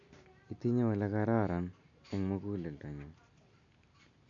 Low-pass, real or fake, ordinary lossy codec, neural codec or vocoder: 7.2 kHz; real; AAC, 64 kbps; none